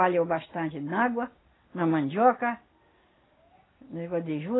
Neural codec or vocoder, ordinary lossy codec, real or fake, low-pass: none; AAC, 16 kbps; real; 7.2 kHz